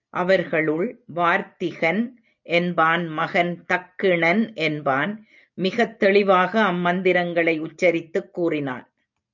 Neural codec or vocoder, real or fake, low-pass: none; real; 7.2 kHz